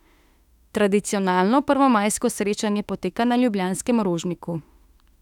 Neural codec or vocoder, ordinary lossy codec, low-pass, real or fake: autoencoder, 48 kHz, 32 numbers a frame, DAC-VAE, trained on Japanese speech; none; 19.8 kHz; fake